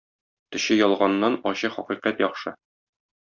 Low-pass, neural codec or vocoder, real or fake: 7.2 kHz; none; real